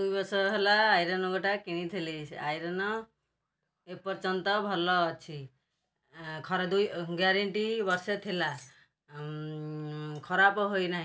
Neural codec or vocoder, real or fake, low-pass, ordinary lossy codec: none; real; none; none